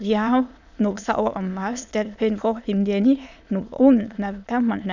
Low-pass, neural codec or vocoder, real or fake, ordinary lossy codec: 7.2 kHz; autoencoder, 22.05 kHz, a latent of 192 numbers a frame, VITS, trained on many speakers; fake; none